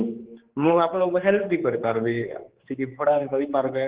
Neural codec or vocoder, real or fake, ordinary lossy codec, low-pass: codec, 16 kHz, 4 kbps, X-Codec, HuBERT features, trained on general audio; fake; Opus, 16 kbps; 3.6 kHz